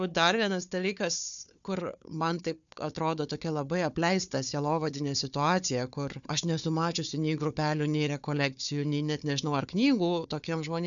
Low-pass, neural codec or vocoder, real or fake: 7.2 kHz; codec, 16 kHz, 4 kbps, FunCodec, trained on Chinese and English, 50 frames a second; fake